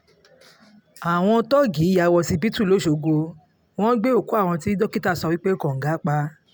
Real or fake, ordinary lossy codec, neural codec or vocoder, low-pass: real; none; none; none